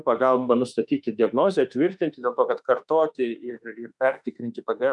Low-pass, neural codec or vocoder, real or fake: 10.8 kHz; autoencoder, 48 kHz, 32 numbers a frame, DAC-VAE, trained on Japanese speech; fake